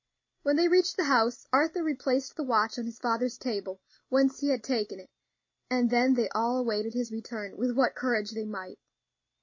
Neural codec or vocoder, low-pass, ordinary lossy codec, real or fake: none; 7.2 kHz; MP3, 32 kbps; real